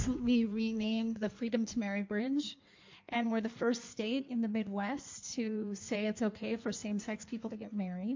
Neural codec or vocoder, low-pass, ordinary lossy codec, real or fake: codec, 16 kHz in and 24 kHz out, 1.1 kbps, FireRedTTS-2 codec; 7.2 kHz; MP3, 48 kbps; fake